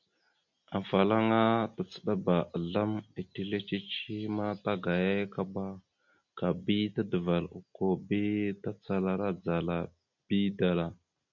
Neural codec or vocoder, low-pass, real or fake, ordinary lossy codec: none; 7.2 kHz; real; MP3, 64 kbps